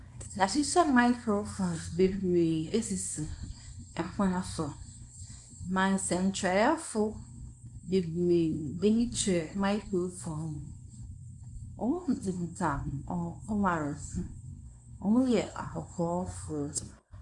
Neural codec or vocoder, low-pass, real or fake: codec, 24 kHz, 0.9 kbps, WavTokenizer, small release; 10.8 kHz; fake